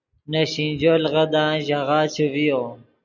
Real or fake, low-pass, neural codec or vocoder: real; 7.2 kHz; none